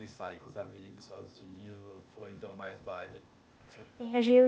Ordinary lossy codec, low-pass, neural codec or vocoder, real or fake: none; none; codec, 16 kHz, 0.8 kbps, ZipCodec; fake